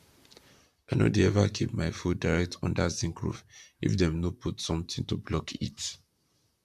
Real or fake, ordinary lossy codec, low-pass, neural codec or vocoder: fake; MP3, 96 kbps; 14.4 kHz; vocoder, 44.1 kHz, 128 mel bands, Pupu-Vocoder